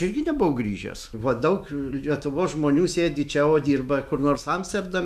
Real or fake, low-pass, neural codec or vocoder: fake; 14.4 kHz; autoencoder, 48 kHz, 128 numbers a frame, DAC-VAE, trained on Japanese speech